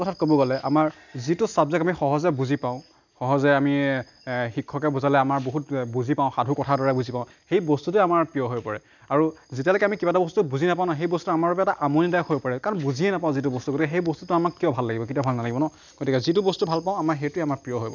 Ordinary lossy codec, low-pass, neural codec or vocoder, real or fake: none; 7.2 kHz; none; real